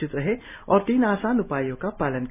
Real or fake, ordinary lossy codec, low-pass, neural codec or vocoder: real; none; 3.6 kHz; none